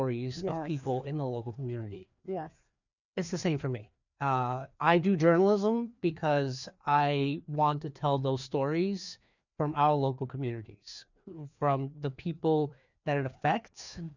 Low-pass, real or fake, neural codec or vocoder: 7.2 kHz; fake; codec, 16 kHz, 2 kbps, FreqCodec, larger model